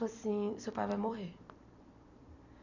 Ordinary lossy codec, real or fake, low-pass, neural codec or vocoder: none; fake; 7.2 kHz; vocoder, 44.1 kHz, 128 mel bands every 256 samples, BigVGAN v2